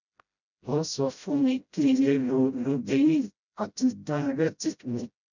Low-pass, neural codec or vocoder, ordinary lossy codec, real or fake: 7.2 kHz; codec, 16 kHz, 0.5 kbps, FreqCodec, smaller model; none; fake